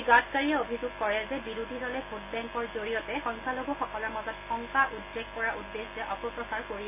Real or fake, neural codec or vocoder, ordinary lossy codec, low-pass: real; none; AAC, 32 kbps; 3.6 kHz